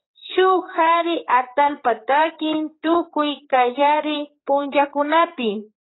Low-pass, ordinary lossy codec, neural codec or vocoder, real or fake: 7.2 kHz; AAC, 16 kbps; codec, 16 kHz, 4 kbps, X-Codec, HuBERT features, trained on general audio; fake